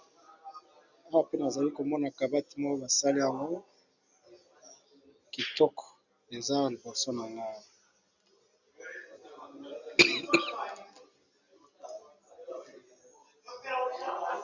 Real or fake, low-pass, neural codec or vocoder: real; 7.2 kHz; none